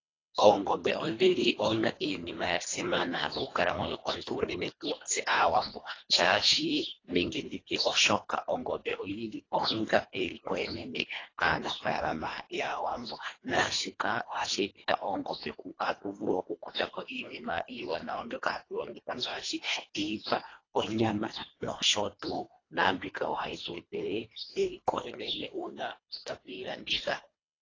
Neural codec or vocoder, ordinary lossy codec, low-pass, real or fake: codec, 24 kHz, 1.5 kbps, HILCodec; AAC, 32 kbps; 7.2 kHz; fake